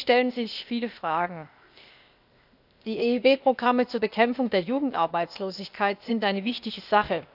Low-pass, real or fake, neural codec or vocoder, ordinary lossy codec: 5.4 kHz; fake; codec, 16 kHz, 0.8 kbps, ZipCodec; none